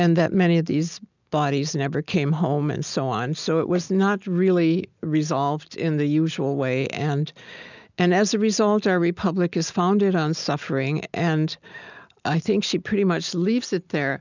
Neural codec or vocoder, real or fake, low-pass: none; real; 7.2 kHz